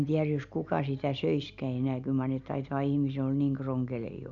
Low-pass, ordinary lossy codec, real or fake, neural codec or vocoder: 7.2 kHz; none; real; none